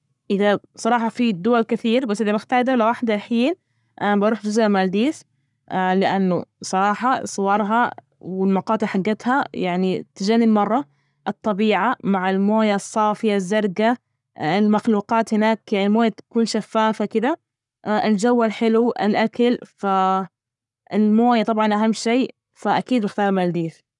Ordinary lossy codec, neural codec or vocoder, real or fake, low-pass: none; codec, 44.1 kHz, 7.8 kbps, Pupu-Codec; fake; 10.8 kHz